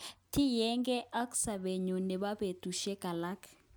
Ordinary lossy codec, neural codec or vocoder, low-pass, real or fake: none; none; none; real